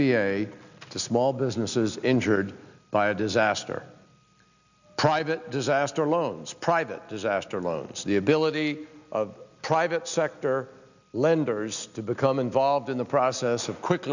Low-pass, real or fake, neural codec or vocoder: 7.2 kHz; real; none